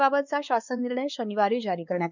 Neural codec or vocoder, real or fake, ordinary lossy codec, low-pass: codec, 16 kHz, 2 kbps, X-Codec, WavLM features, trained on Multilingual LibriSpeech; fake; none; 7.2 kHz